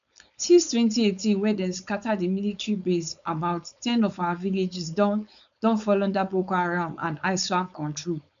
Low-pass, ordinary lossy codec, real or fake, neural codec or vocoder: 7.2 kHz; none; fake; codec, 16 kHz, 4.8 kbps, FACodec